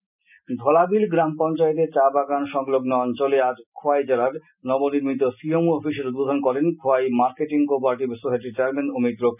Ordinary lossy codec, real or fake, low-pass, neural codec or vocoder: none; real; 3.6 kHz; none